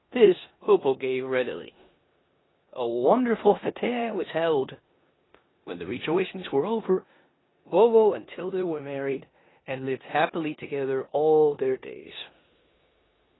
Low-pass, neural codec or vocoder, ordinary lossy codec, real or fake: 7.2 kHz; codec, 16 kHz in and 24 kHz out, 0.9 kbps, LongCat-Audio-Codec, four codebook decoder; AAC, 16 kbps; fake